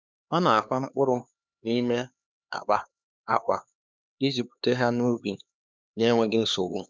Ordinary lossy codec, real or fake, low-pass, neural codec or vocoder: none; fake; none; codec, 16 kHz, 2 kbps, X-Codec, HuBERT features, trained on LibriSpeech